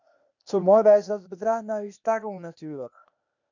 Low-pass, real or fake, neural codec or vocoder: 7.2 kHz; fake; codec, 16 kHz, 0.8 kbps, ZipCodec